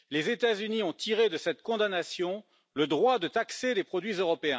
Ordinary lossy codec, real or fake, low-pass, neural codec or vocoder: none; real; none; none